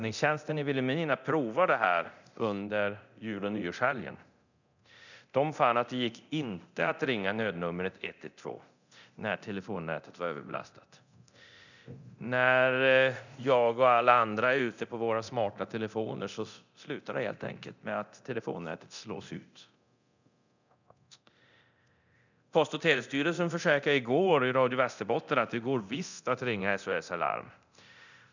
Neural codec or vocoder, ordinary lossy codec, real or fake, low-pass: codec, 24 kHz, 0.9 kbps, DualCodec; none; fake; 7.2 kHz